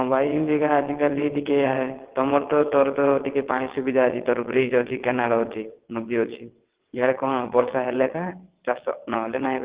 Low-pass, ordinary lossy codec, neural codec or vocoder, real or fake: 3.6 kHz; Opus, 16 kbps; vocoder, 22.05 kHz, 80 mel bands, WaveNeXt; fake